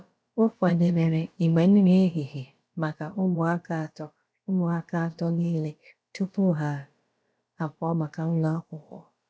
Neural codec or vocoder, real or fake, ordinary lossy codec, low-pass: codec, 16 kHz, about 1 kbps, DyCAST, with the encoder's durations; fake; none; none